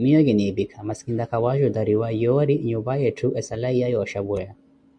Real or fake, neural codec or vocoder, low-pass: real; none; 9.9 kHz